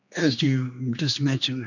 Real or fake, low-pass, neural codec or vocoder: fake; 7.2 kHz; codec, 16 kHz, 2 kbps, X-Codec, HuBERT features, trained on general audio